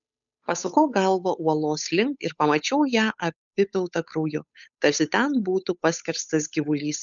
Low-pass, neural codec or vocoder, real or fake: 7.2 kHz; codec, 16 kHz, 8 kbps, FunCodec, trained on Chinese and English, 25 frames a second; fake